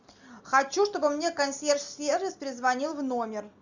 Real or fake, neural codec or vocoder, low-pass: real; none; 7.2 kHz